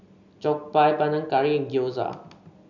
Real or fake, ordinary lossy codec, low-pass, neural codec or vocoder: real; MP3, 64 kbps; 7.2 kHz; none